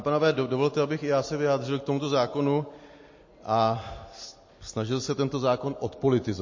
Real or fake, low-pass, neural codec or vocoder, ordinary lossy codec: real; 7.2 kHz; none; MP3, 32 kbps